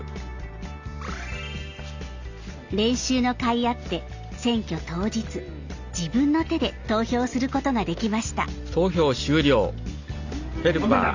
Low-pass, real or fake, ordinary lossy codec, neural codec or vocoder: 7.2 kHz; real; Opus, 64 kbps; none